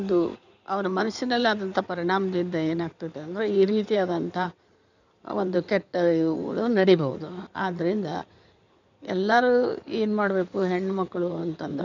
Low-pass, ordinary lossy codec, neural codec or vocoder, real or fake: 7.2 kHz; none; codec, 16 kHz in and 24 kHz out, 2.2 kbps, FireRedTTS-2 codec; fake